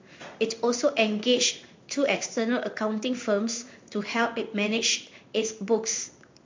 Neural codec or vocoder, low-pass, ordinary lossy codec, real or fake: codec, 16 kHz in and 24 kHz out, 1 kbps, XY-Tokenizer; 7.2 kHz; MP3, 48 kbps; fake